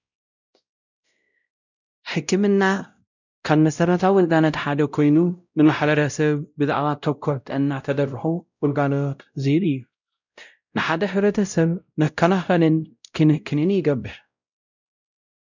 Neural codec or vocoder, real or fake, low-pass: codec, 16 kHz, 0.5 kbps, X-Codec, WavLM features, trained on Multilingual LibriSpeech; fake; 7.2 kHz